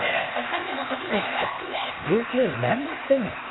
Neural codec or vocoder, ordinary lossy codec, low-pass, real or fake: codec, 16 kHz, 0.8 kbps, ZipCodec; AAC, 16 kbps; 7.2 kHz; fake